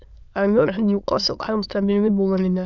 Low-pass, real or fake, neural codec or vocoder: 7.2 kHz; fake; autoencoder, 22.05 kHz, a latent of 192 numbers a frame, VITS, trained on many speakers